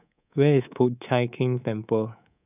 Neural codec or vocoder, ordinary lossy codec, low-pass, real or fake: codec, 24 kHz, 3.1 kbps, DualCodec; none; 3.6 kHz; fake